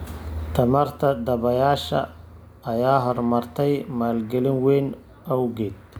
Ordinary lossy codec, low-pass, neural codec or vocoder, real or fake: none; none; none; real